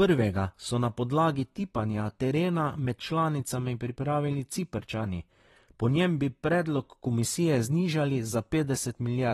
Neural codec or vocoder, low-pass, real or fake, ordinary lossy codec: vocoder, 44.1 kHz, 128 mel bands, Pupu-Vocoder; 19.8 kHz; fake; AAC, 32 kbps